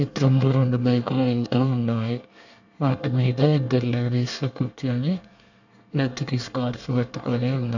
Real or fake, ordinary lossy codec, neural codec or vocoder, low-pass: fake; none; codec, 24 kHz, 1 kbps, SNAC; 7.2 kHz